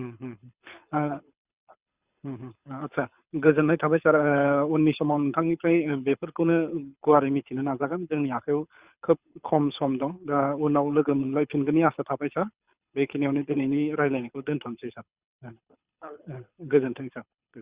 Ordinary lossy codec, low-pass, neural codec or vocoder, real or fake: Opus, 64 kbps; 3.6 kHz; codec, 24 kHz, 6 kbps, HILCodec; fake